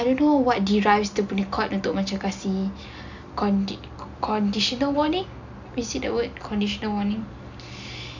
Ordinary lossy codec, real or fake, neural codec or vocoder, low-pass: none; real; none; 7.2 kHz